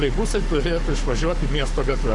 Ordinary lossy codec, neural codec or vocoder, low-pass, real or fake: MP3, 64 kbps; codec, 44.1 kHz, 7.8 kbps, Pupu-Codec; 10.8 kHz; fake